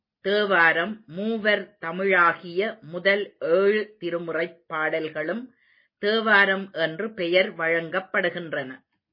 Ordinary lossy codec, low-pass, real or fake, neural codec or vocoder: MP3, 24 kbps; 5.4 kHz; real; none